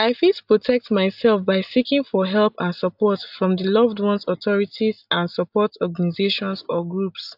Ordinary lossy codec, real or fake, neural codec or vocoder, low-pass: AAC, 48 kbps; real; none; 5.4 kHz